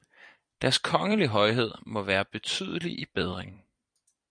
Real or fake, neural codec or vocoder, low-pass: fake; vocoder, 44.1 kHz, 128 mel bands every 256 samples, BigVGAN v2; 9.9 kHz